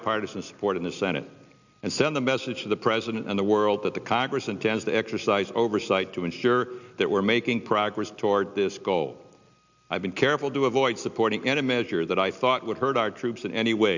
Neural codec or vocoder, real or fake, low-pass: none; real; 7.2 kHz